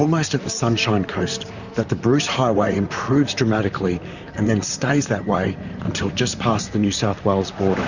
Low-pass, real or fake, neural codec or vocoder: 7.2 kHz; fake; vocoder, 44.1 kHz, 128 mel bands, Pupu-Vocoder